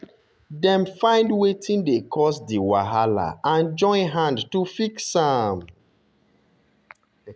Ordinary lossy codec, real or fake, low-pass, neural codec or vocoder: none; real; none; none